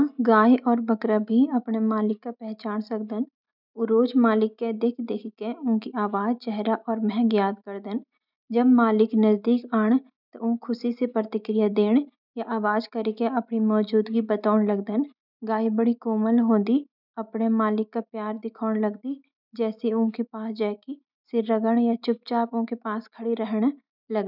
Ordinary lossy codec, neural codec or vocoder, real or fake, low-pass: none; none; real; 5.4 kHz